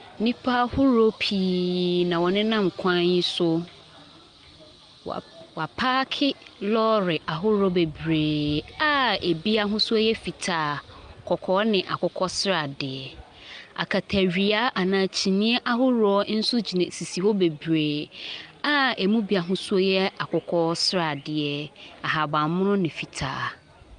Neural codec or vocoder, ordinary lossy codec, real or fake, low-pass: none; Opus, 32 kbps; real; 9.9 kHz